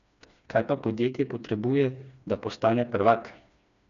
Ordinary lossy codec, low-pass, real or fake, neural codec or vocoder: none; 7.2 kHz; fake; codec, 16 kHz, 2 kbps, FreqCodec, smaller model